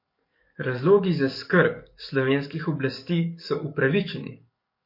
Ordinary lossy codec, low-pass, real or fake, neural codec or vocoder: MP3, 32 kbps; 5.4 kHz; fake; codec, 44.1 kHz, 7.8 kbps, DAC